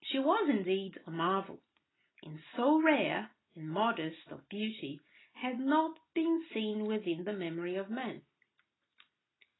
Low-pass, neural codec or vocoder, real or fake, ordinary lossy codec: 7.2 kHz; none; real; AAC, 16 kbps